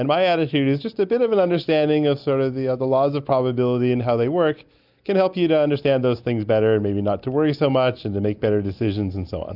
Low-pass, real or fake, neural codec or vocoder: 5.4 kHz; real; none